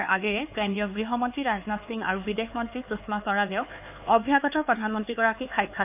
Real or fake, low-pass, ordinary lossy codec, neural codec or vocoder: fake; 3.6 kHz; none; codec, 16 kHz, 4 kbps, X-Codec, HuBERT features, trained on LibriSpeech